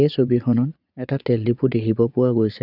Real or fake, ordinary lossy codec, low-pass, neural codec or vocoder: fake; none; 5.4 kHz; vocoder, 22.05 kHz, 80 mel bands, Vocos